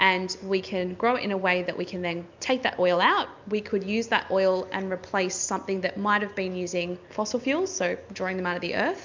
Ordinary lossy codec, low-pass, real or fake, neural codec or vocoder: MP3, 64 kbps; 7.2 kHz; real; none